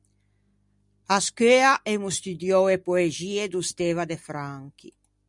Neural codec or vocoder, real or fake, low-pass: none; real; 10.8 kHz